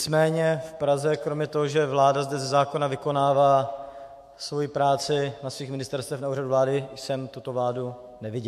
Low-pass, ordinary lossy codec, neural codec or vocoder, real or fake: 14.4 kHz; MP3, 64 kbps; autoencoder, 48 kHz, 128 numbers a frame, DAC-VAE, trained on Japanese speech; fake